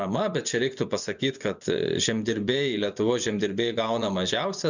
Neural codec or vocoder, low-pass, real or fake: vocoder, 24 kHz, 100 mel bands, Vocos; 7.2 kHz; fake